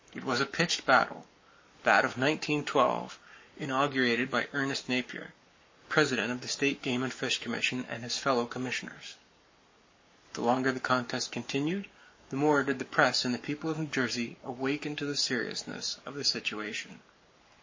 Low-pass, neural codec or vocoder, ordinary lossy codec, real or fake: 7.2 kHz; codec, 44.1 kHz, 7.8 kbps, Pupu-Codec; MP3, 32 kbps; fake